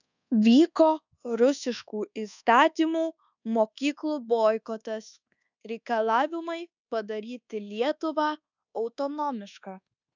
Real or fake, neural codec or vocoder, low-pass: fake; codec, 24 kHz, 1.2 kbps, DualCodec; 7.2 kHz